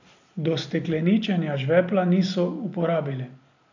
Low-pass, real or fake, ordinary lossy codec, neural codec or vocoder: 7.2 kHz; real; AAC, 48 kbps; none